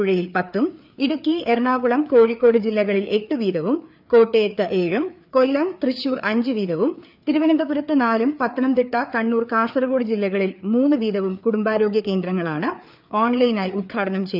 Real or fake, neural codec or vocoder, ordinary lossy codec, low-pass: fake; codec, 16 kHz, 4 kbps, FreqCodec, larger model; none; 5.4 kHz